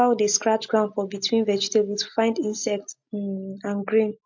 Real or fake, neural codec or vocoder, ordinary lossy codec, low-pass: real; none; MP3, 48 kbps; 7.2 kHz